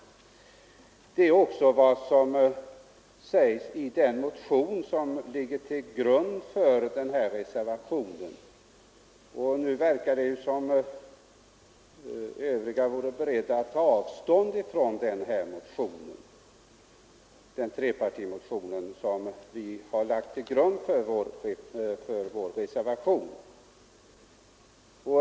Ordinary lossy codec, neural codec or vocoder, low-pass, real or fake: none; none; none; real